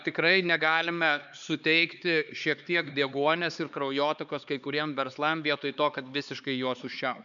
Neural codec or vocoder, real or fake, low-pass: codec, 16 kHz, 4 kbps, X-Codec, HuBERT features, trained on LibriSpeech; fake; 7.2 kHz